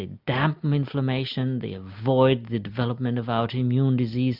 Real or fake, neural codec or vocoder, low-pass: real; none; 5.4 kHz